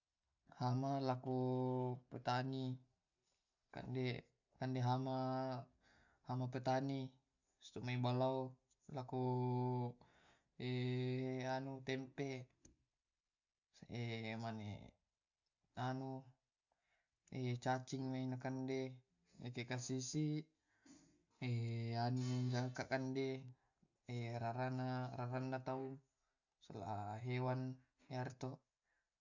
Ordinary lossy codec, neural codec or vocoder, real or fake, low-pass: none; none; real; 7.2 kHz